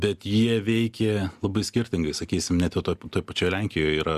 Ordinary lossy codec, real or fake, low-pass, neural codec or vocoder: Opus, 64 kbps; real; 14.4 kHz; none